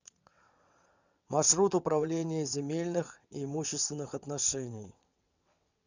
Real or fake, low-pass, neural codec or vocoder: fake; 7.2 kHz; vocoder, 22.05 kHz, 80 mel bands, WaveNeXt